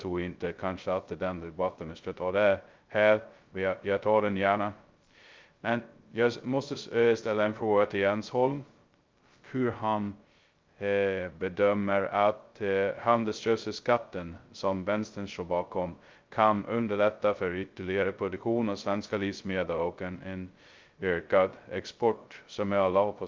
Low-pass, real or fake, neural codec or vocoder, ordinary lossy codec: 7.2 kHz; fake; codec, 16 kHz, 0.2 kbps, FocalCodec; Opus, 32 kbps